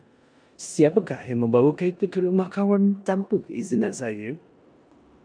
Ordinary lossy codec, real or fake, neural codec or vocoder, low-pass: MP3, 96 kbps; fake; codec, 16 kHz in and 24 kHz out, 0.9 kbps, LongCat-Audio-Codec, four codebook decoder; 9.9 kHz